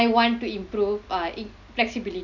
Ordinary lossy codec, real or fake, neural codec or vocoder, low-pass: none; real; none; 7.2 kHz